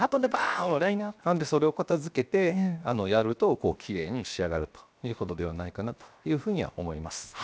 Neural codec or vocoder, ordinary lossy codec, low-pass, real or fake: codec, 16 kHz, 0.7 kbps, FocalCodec; none; none; fake